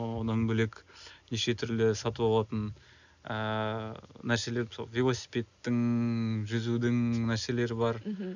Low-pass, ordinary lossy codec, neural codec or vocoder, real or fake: 7.2 kHz; none; none; real